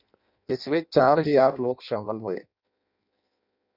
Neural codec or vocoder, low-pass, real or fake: codec, 16 kHz in and 24 kHz out, 0.6 kbps, FireRedTTS-2 codec; 5.4 kHz; fake